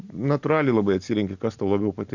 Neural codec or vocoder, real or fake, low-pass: none; real; 7.2 kHz